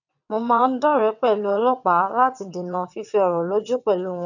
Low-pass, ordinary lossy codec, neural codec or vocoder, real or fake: 7.2 kHz; none; vocoder, 22.05 kHz, 80 mel bands, WaveNeXt; fake